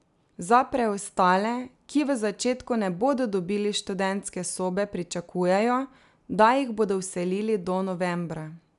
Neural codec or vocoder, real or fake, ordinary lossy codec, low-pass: none; real; none; 10.8 kHz